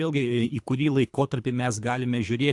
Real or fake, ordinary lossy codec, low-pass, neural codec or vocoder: fake; AAC, 64 kbps; 10.8 kHz; codec, 24 kHz, 3 kbps, HILCodec